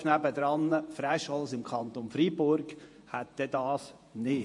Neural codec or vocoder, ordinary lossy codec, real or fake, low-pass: vocoder, 48 kHz, 128 mel bands, Vocos; MP3, 48 kbps; fake; 10.8 kHz